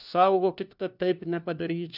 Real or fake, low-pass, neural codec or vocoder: fake; 5.4 kHz; codec, 16 kHz, 1 kbps, FunCodec, trained on LibriTTS, 50 frames a second